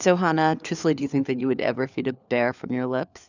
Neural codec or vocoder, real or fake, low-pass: codec, 16 kHz, 4 kbps, FunCodec, trained on LibriTTS, 50 frames a second; fake; 7.2 kHz